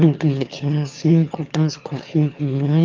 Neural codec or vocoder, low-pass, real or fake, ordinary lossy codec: autoencoder, 22.05 kHz, a latent of 192 numbers a frame, VITS, trained on one speaker; 7.2 kHz; fake; Opus, 32 kbps